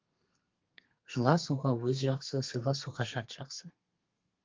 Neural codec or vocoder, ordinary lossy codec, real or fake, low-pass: codec, 32 kHz, 1.9 kbps, SNAC; Opus, 32 kbps; fake; 7.2 kHz